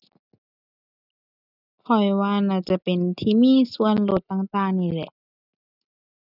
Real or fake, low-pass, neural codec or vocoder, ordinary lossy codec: real; 5.4 kHz; none; none